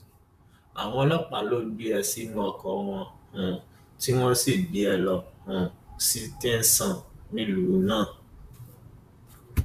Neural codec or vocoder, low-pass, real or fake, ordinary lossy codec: vocoder, 44.1 kHz, 128 mel bands, Pupu-Vocoder; 14.4 kHz; fake; none